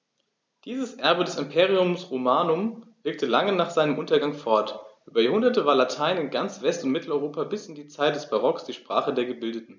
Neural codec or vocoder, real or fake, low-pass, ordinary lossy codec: none; real; none; none